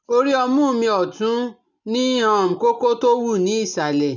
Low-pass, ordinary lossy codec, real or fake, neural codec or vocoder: 7.2 kHz; none; real; none